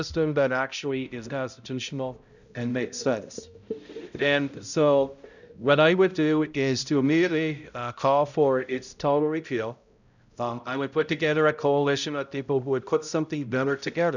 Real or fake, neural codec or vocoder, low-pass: fake; codec, 16 kHz, 0.5 kbps, X-Codec, HuBERT features, trained on balanced general audio; 7.2 kHz